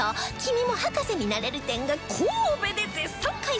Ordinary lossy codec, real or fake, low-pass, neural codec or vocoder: none; real; none; none